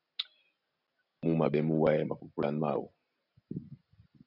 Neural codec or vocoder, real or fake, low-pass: none; real; 5.4 kHz